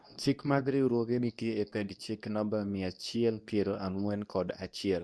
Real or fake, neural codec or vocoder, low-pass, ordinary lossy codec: fake; codec, 24 kHz, 0.9 kbps, WavTokenizer, medium speech release version 2; none; none